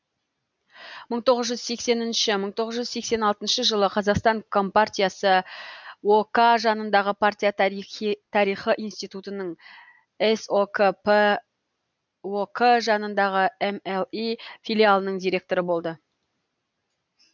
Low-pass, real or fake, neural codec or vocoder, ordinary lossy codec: 7.2 kHz; real; none; none